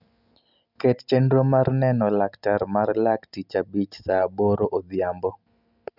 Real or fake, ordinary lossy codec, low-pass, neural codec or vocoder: real; none; 5.4 kHz; none